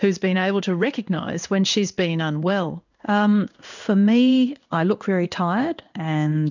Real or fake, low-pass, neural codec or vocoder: fake; 7.2 kHz; codec, 16 kHz in and 24 kHz out, 1 kbps, XY-Tokenizer